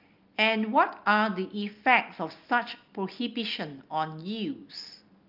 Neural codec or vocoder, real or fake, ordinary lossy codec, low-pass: none; real; Opus, 24 kbps; 5.4 kHz